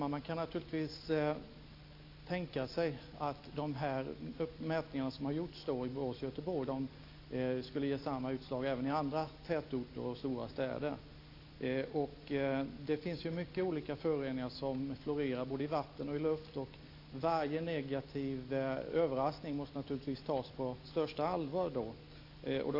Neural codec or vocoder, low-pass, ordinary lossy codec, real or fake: none; 5.4 kHz; AAC, 32 kbps; real